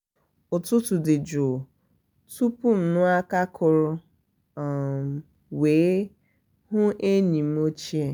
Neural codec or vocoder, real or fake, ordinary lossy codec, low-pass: none; real; none; none